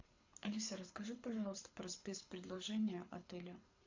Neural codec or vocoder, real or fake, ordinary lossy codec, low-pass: codec, 24 kHz, 6 kbps, HILCodec; fake; MP3, 64 kbps; 7.2 kHz